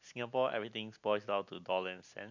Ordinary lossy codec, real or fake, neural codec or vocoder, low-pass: none; real; none; 7.2 kHz